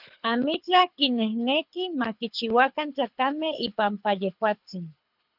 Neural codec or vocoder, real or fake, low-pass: codec, 24 kHz, 6 kbps, HILCodec; fake; 5.4 kHz